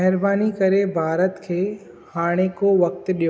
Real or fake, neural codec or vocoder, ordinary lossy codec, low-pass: real; none; none; none